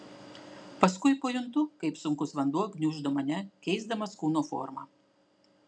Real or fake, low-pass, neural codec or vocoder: real; 9.9 kHz; none